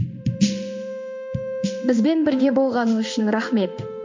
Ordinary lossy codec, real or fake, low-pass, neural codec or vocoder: MP3, 48 kbps; fake; 7.2 kHz; codec, 16 kHz in and 24 kHz out, 1 kbps, XY-Tokenizer